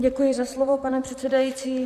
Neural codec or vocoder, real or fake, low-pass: vocoder, 44.1 kHz, 128 mel bands, Pupu-Vocoder; fake; 14.4 kHz